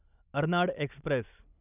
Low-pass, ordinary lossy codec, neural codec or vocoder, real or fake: 3.6 kHz; none; none; real